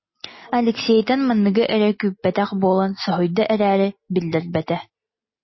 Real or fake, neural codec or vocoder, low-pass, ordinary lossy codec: real; none; 7.2 kHz; MP3, 24 kbps